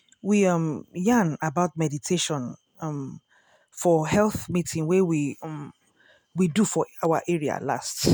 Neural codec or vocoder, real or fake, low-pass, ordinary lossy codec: none; real; none; none